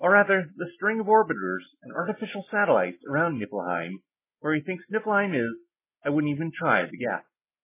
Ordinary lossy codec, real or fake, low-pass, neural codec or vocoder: MP3, 16 kbps; real; 3.6 kHz; none